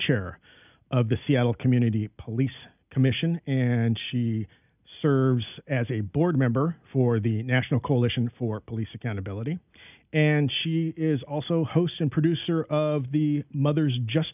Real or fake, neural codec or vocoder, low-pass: real; none; 3.6 kHz